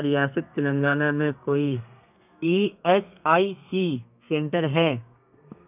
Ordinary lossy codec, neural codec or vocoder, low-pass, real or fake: none; codec, 32 kHz, 1.9 kbps, SNAC; 3.6 kHz; fake